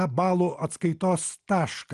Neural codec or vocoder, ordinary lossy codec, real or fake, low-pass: none; Opus, 24 kbps; real; 10.8 kHz